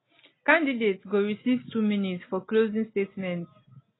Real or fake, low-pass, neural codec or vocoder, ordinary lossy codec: real; 7.2 kHz; none; AAC, 16 kbps